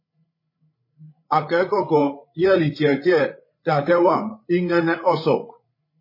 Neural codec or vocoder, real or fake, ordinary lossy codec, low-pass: codec, 16 kHz, 8 kbps, FreqCodec, larger model; fake; MP3, 24 kbps; 5.4 kHz